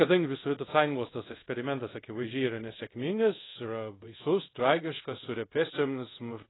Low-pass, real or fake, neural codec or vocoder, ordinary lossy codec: 7.2 kHz; fake; codec, 24 kHz, 0.5 kbps, DualCodec; AAC, 16 kbps